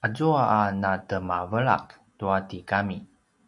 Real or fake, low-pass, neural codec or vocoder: real; 10.8 kHz; none